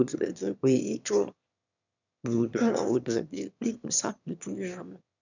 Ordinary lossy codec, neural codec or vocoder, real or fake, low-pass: none; autoencoder, 22.05 kHz, a latent of 192 numbers a frame, VITS, trained on one speaker; fake; 7.2 kHz